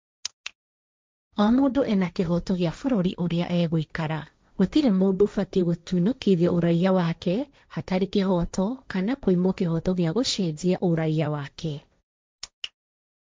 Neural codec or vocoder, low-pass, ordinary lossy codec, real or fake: codec, 16 kHz, 1.1 kbps, Voila-Tokenizer; none; none; fake